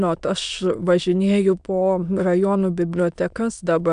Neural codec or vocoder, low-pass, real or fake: autoencoder, 22.05 kHz, a latent of 192 numbers a frame, VITS, trained on many speakers; 9.9 kHz; fake